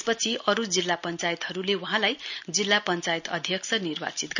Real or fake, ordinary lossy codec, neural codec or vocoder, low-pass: real; none; none; 7.2 kHz